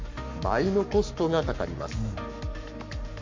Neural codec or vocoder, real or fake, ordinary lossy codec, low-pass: codec, 44.1 kHz, 7.8 kbps, Pupu-Codec; fake; MP3, 48 kbps; 7.2 kHz